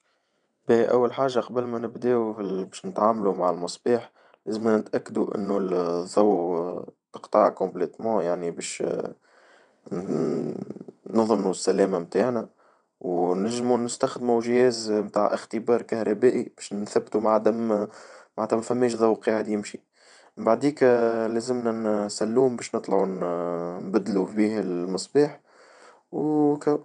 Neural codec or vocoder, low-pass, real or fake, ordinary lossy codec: vocoder, 22.05 kHz, 80 mel bands, WaveNeXt; 9.9 kHz; fake; none